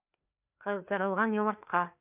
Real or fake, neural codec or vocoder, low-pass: fake; codec, 16 kHz, 2 kbps, FreqCodec, larger model; 3.6 kHz